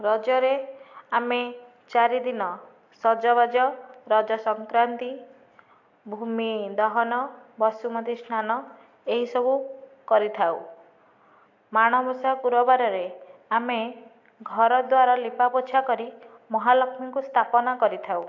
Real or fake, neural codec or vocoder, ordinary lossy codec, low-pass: real; none; AAC, 48 kbps; 7.2 kHz